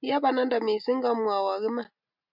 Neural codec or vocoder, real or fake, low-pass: none; real; 5.4 kHz